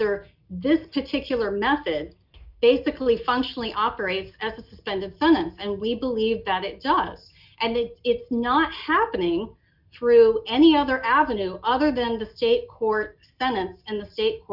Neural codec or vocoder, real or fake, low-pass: none; real; 5.4 kHz